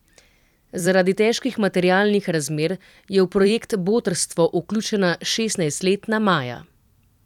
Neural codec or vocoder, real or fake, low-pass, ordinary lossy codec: vocoder, 44.1 kHz, 128 mel bands every 256 samples, BigVGAN v2; fake; 19.8 kHz; none